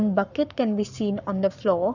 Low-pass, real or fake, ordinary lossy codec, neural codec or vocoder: 7.2 kHz; fake; none; codec, 44.1 kHz, 7.8 kbps, Pupu-Codec